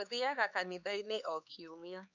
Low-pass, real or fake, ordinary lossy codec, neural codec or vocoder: 7.2 kHz; fake; none; codec, 16 kHz, 4 kbps, X-Codec, HuBERT features, trained on LibriSpeech